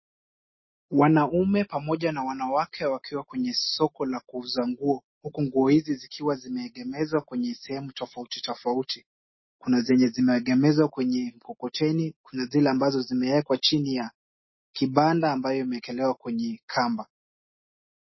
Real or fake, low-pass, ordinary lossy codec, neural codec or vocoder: real; 7.2 kHz; MP3, 24 kbps; none